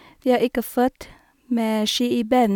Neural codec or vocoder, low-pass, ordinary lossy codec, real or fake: none; 19.8 kHz; none; real